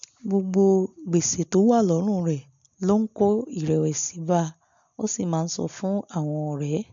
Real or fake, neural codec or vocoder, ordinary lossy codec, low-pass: real; none; MP3, 64 kbps; 7.2 kHz